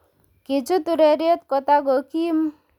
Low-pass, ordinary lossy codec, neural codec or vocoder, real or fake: 19.8 kHz; none; none; real